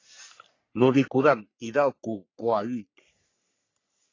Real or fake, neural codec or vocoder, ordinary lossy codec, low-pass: fake; codec, 44.1 kHz, 3.4 kbps, Pupu-Codec; AAC, 32 kbps; 7.2 kHz